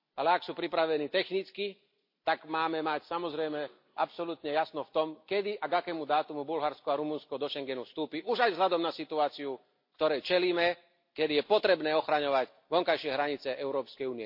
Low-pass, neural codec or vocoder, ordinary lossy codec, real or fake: 5.4 kHz; none; MP3, 32 kbps; real